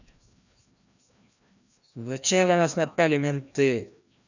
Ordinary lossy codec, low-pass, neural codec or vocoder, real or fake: none; 7.2 kHz; codec, 16 kHz, 1 kbps, FreqCodec, larger model; fake